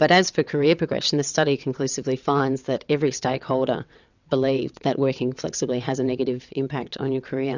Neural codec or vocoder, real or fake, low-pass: vocoder, 22.05 kHz, 80 mel bands, WaveNeXt; fake; 7.2 kHz